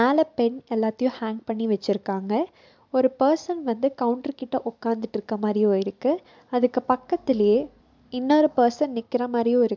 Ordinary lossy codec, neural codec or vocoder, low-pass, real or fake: MP3, 64 kbps; none; 7.2 kHz; real